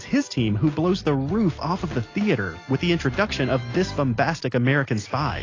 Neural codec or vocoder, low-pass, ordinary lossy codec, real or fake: none; 7.2 kHz; AAC, 32 kbps; real